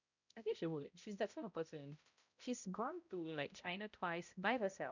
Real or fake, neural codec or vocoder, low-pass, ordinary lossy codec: fake; codec, 16 kHz, 0.5 kbps, X-Codec, HuBERT features, trained on balanced general audio; 7.2 kHz; none